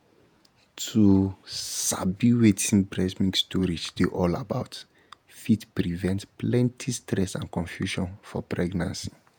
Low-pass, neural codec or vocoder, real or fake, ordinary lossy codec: none; vocoder, 48 kHz, 128 mel bands, Vocos; fake; none